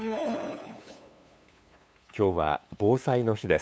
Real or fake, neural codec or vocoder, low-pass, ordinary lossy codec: fake; codec, 16 kHz, 2 kbps, FunCodec, trained on LibriTTS, 25 frames a second; none; none